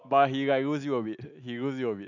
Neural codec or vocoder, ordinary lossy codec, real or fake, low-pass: none; none; real; 7.2 kHz